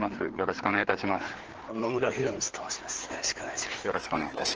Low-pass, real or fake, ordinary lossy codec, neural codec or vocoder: 7.2 kHz; fake; Opus, 16 kbps; codec, 16 kHz, 4 kbps, FreqCodec, larger model